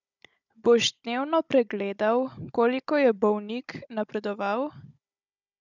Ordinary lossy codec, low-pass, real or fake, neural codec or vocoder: none; 7.2 kHz; fake; codec, 16 kHz, 16 kbps, FunCodec, trained on Chinese and English, 50 frames a second